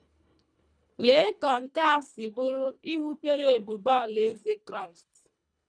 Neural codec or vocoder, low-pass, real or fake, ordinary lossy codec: codec, 24 kHz, 1.5 kbps, HILCodec; 9.9 kHz; fake; none